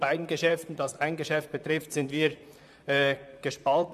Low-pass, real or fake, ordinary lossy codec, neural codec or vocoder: 14.4 kHz; fake; MP3, 96 kbps; vocoder, 44.1 kHz, 128 mel bands, Pupu-Vocoder